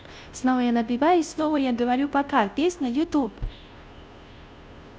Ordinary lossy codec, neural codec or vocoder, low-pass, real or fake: none; codec, 16 kHz, 0.5 kbps, FunCodec, trained on Chinese and English, 25 frames a second; none; fake